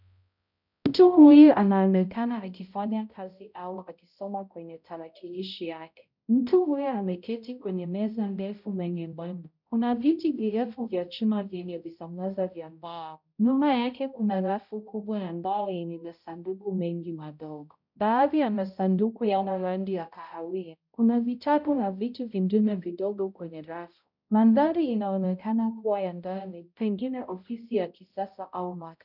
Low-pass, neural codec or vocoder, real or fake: 5.4 kHz; codec, 16 kHz, 0.5 kbps, X-Codec, HuBERT features, trained on balanced general audio; fake